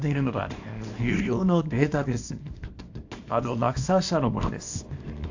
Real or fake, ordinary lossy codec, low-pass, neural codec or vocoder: fake; AAC, 48 kbps; 7.2 kHz; codec, 24 kHz, 0.9 kbps, WavTokenizer, small release